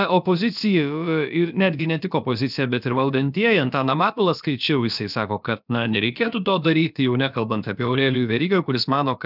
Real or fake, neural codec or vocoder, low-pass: fake; codec, 16 kHz, about 1 kbps, DyCAST, with the encoder's durations; 5.4 kHz